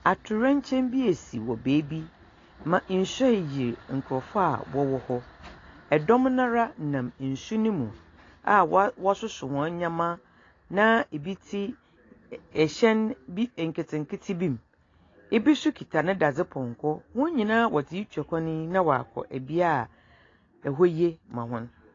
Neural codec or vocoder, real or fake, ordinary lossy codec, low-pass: none; real; AAC, 32 kbps; 7.2 kHz